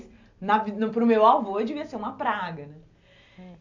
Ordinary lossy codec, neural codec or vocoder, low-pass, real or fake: AAC, 48 kbps; none; 7.2 kHz; real